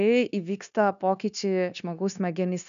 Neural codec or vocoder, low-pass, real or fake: codec, 16 kHz, 0.9 kbps, LongCat-Audio-Codec; 7.2 kHz; fake